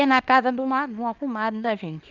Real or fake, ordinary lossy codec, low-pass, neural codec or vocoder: fake; Opus, 24 kbps; 7.2 kHz; codec, 24 kHz, 0.9 kbps, WavTokenizer, small release